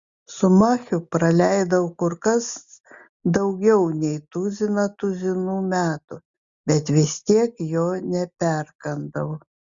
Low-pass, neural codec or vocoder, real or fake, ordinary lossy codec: 7.2 kHz; none; real; Opus, 64 kbps